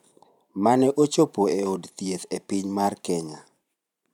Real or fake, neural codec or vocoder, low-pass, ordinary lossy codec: fake; vocoder, 44.1 kHz, 128 mel bands every 512 samples, BigVGAN v2; 19.8 kHz; none